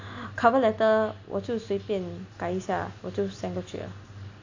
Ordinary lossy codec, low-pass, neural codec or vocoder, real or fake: AAC, 48 kbps; 7.2 kHz; none; real